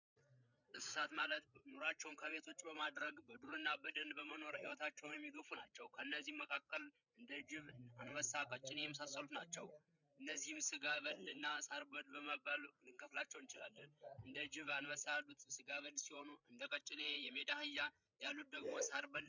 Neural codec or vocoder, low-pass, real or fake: codec, 16 kHz, 8 kbps, FreqCodec, larger model; 7.2 kHz; fake